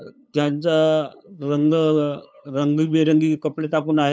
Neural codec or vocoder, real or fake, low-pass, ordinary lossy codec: codec, 16 kHz, 8 kbps, FunCodec, trained on LibriTTS, 25 frames a second; fake; none; none